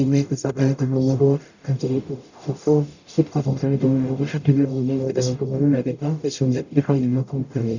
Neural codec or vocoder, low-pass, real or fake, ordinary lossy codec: codec, 44.1 kHz, 0.9 kbps, DAC; 7.2 kHz; fake; none